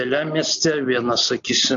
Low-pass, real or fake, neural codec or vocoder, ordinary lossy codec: 7.2 kHz; real; none; AAC, 64 kbps